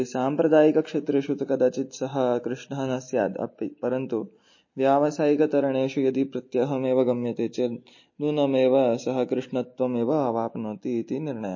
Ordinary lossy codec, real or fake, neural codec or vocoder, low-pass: MP3, 32 kbps; real; none; 7.2 kHz